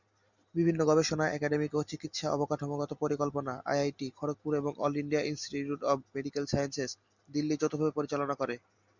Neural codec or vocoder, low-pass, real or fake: none; 7.2 kHz; real